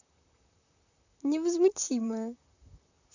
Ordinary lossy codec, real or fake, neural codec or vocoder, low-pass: none; fake; vocoder, 44.1 kHz, 128 mel bands, Pupu-Vocoder; 7.2 kHz